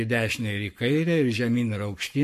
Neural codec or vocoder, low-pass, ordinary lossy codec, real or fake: codec, 44.1 kHz, 7.8 kbps, Pupu-Codec; 14.4 kHz; MP3, 64 kbps; fake